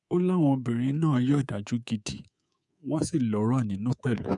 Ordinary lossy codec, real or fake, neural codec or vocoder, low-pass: none; fake; codec, 24 kHz, 3.1 kbps, DualCodec; 10.8 kHz